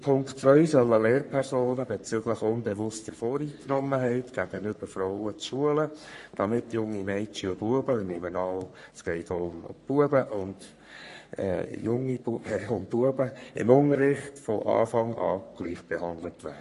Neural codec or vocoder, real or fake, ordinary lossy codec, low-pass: codec, 44.1 kHz, 3.4 kbps, Pupu-Codec; fake; MP3, 48 kbps; 14.4 kHz